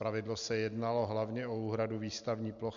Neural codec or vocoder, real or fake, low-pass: none; real; 7.2 kHz